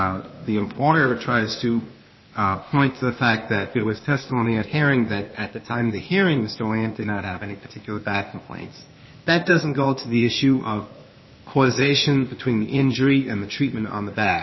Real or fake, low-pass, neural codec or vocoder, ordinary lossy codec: fake; 7.2 kHz; codec, 16 kHz, 0.8 kbps, ZipCodec; MP3, 24 kbps